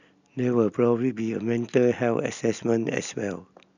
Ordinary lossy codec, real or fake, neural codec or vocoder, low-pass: none; real; none; 7.2 kHz